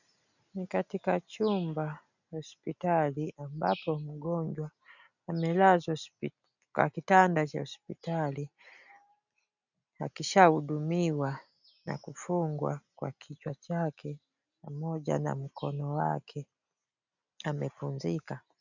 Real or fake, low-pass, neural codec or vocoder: real; 7.2 kHz; none